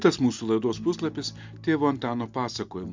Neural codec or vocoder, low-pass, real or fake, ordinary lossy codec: none; 7.2 kHz; real; MP3, 64 kbps